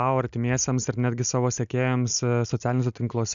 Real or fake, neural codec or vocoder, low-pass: real; none; 7.2 kHz